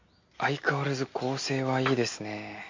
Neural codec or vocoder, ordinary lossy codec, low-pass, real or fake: none; MP3, 48 kbps; 7.2 kHz; real